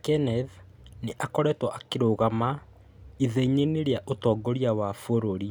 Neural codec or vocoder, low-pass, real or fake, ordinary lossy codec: none; none; real; none